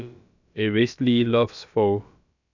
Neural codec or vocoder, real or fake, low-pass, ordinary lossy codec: codec, 16 kHz, about 1 kbps, DyCAST, with the encoder's durations; fake; 7.2 kHz; none